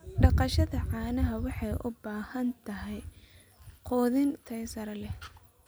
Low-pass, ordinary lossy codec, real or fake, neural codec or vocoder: none; none; real; none